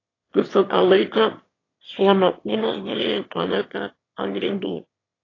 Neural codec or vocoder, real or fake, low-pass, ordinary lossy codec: autoencoder, 22.05 kHz, a latent of 192 numbers a frame, VITS, trained on one speaker; fake; 7.2 kHz; AAC, 32 kbps